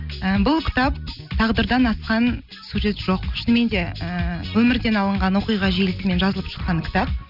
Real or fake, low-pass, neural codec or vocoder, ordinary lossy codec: real; 5.4 kHz; none; none